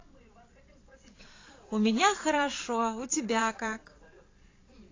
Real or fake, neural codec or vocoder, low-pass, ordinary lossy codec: fake; vocoder, 22.05 kHz, 80 mel bands, Vocos; 7.2 kHz; AAC, 32 kbps